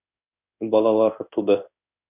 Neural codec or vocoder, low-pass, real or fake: codec, 16 kHz in and 24 kHz out, 1 kbps, XY-Tokenizer; 3.6 kHz; fake